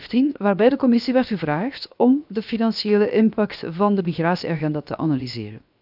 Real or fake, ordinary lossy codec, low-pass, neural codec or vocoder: fake; none; 5.4 kHz; codec, 16 kHz, 0.7 kbps, FocalCodec